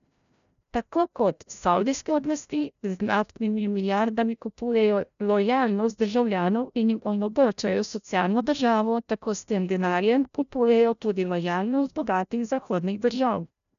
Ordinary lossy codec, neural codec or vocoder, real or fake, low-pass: none; codec, 16 kHz, 0.5 kbps, FreqCodec, larger model; fake; 7.2 kHz